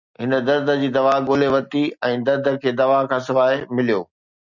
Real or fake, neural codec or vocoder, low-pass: real; none; 7.2 kHz